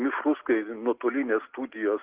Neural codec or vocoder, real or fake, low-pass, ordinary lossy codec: none; real; 3.6 kHz; Opus, 16 kbps